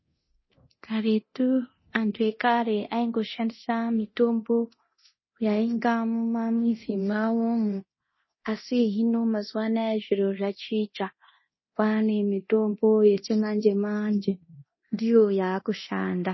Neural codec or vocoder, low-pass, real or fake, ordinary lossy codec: codec, 24 kHz, 0.9 kbps, DualCodec; 7.2 kHz; fake; MP3, 24 kbps